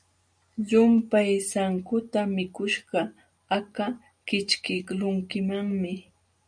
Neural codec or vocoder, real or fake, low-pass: none; real; 9.9 kHz